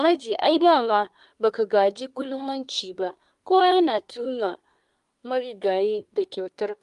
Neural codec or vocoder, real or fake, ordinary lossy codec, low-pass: codec, 24 kHz, 1 kbps, SNAC; fake; Opus, 32 kbps; 10.8 kHz